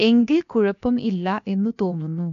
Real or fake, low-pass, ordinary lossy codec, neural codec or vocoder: fake; 7.2 kHz; none; codec, 16 kHz, 0.7 kbps, FocalCodec